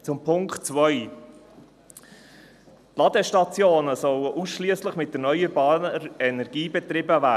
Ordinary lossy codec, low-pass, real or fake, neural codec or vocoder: none; 14.4 kHz; real; none